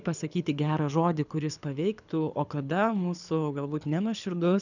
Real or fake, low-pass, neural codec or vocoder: fake; 7.2 kHz; codec, 24 kHz, 6 kbps, HILCodec